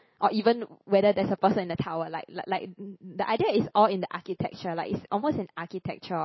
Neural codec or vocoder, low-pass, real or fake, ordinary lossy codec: none; 7.2 kHz; real; MP3, 24 kbps